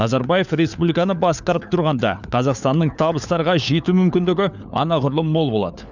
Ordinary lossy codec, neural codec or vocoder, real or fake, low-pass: none; codec, 16 kHz, 4 kbps, FunCodec, trained on LibriTTS, 50 frames a second; fake; 7.2 kHz